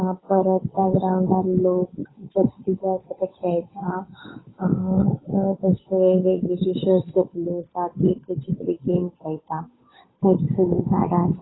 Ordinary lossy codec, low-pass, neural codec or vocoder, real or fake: AAC, 16 kbps; 7.2 kHz; codec, 16 kHz, 8 kbps, FunCodec, trained on Chinese and English, 25 frames a second; fake